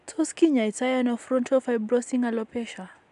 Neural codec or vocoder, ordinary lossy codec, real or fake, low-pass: none; none; real; 10.8 kHz